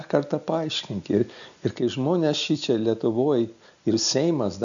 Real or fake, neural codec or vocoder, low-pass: real; none; 7.2 kHz